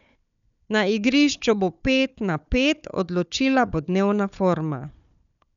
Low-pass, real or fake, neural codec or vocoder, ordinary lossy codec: 7.2 kHz; fake; codec, 16 kHz, 4 kbps, FunCodec, trained on Chinese and English, 50 frames a second; none